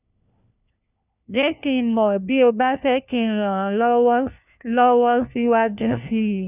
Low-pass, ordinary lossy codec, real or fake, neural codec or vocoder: 3.6 kHz; none; fake; codec, 16 kHz, 1 kbps, FunCodec, trained on LibriTTS, 50 frames a second